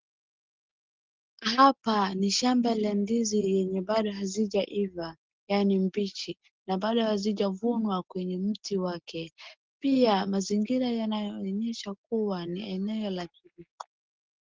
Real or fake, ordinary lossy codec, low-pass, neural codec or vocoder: real; Opus, 16 kbps; 7.2 kHz; none